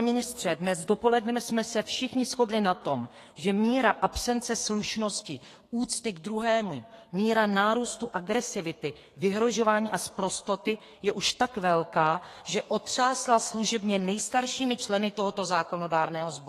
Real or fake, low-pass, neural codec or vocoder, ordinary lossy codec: fake; 14.4 kHz; codec, 32 kHz, 1.9 kbps, SNAC; AAC, 48 kbps